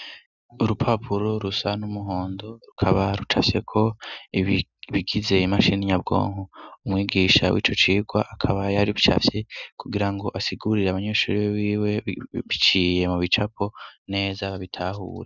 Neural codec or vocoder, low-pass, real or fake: none; 7.2 kHz; real